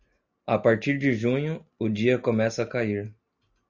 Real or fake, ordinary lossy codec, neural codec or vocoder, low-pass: real; Opus, 64 kbps; none; 7.2 kHz